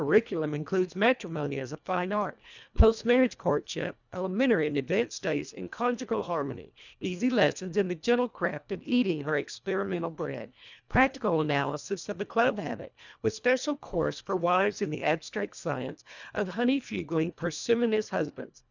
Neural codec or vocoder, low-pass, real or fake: codec, 24 kHz, 1.5 kbps, HILCodec; 7.2 kHz; fake